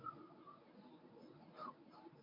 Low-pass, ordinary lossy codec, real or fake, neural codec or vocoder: 5.4 kHz; MP3, 48 kbps; fake; codec, 24 kHz, 0.9 kbps, WavTokenizer, medium speech release version 1